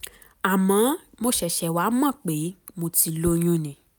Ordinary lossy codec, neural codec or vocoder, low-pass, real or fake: none; none; none; real